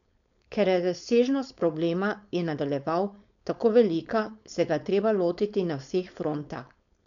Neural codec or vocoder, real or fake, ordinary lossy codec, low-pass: codec, 16 kHz, 4.8 kbps, FACodec; fake; MP3, 96 kbps; 7.2 kHz